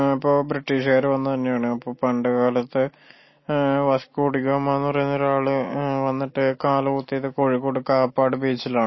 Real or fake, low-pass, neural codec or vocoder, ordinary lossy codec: real; 7.2 kHz; none; MP3, 24 kbps